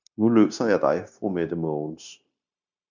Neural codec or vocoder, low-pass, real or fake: codec, 16 kHz, 0.9 kbps, LongCat-Audio-Codec; 7.2 kHz; fake